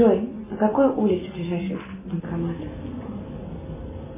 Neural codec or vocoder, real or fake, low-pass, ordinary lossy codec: none; real; 3.6 kHz; MP3, 16 kbps